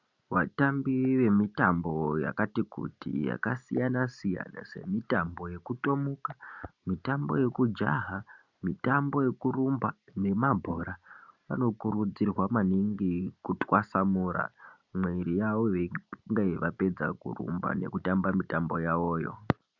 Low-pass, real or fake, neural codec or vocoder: 7.2 kHz; real; none